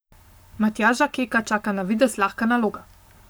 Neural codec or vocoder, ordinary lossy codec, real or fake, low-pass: codec, 44.1 kHz, 7.8 kbps, Pupu-Codec; none; fake; none